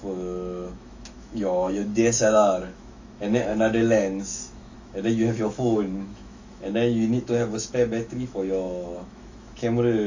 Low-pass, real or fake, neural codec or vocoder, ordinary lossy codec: 7.2 kHz; fake; autoencoder, 48 kHz, 128 numbers a frame, DAC-VAE, trained on Japanese speech; AAC, 48 kbps